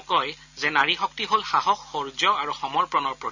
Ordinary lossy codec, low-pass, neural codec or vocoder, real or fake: none; 7.2 kHz; none; real